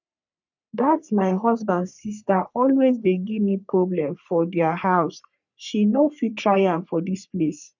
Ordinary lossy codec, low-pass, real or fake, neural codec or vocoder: none; 7.2 kHz; fake; codec, 44.1 kHz, 3.4 kbps, Pupu-Codec